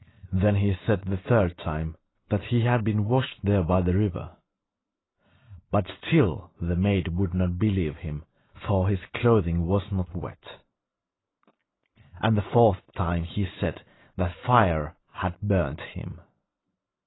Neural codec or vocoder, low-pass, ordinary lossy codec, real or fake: none; 7.2 kHz; AAC, 16 kbps; real